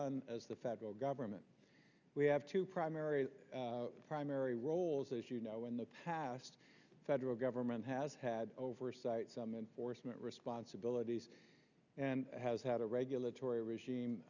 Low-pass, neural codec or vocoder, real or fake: 7.2 kHz; none; real